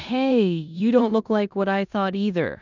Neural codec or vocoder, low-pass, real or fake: codec, 16 kHz in and 24 kHz out, 0.9 kbps, LongCat-Audio-Codec, four codebook decoder; 7.2 kHz; fake